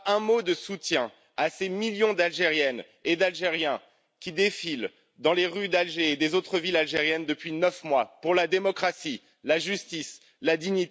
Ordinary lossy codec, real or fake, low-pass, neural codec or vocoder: none; real; none; none